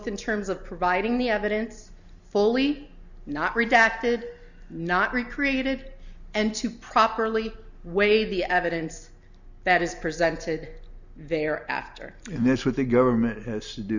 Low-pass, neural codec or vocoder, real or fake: 7.2 kHz; none; real